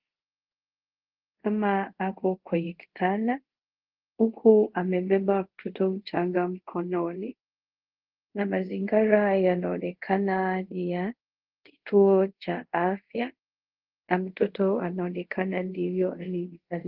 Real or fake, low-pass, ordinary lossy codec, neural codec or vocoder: fake; 5.4 kHz; Opus, 16 kbps; codec, 24 kHz, 0.5 kbps, DualCodec